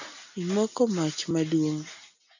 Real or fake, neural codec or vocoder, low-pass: real; none; 7.2 kHz